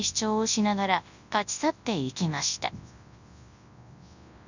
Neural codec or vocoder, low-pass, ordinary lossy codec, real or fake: codec, 24 kHz, 0.9 kbps, WavTokenizer, large speech release; 7.2 kHz; none; fake